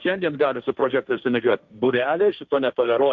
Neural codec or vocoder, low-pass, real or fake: codec, 16 kHz, 1.1 kbps, Voila-Tokenizer; 7.2 kHz; fake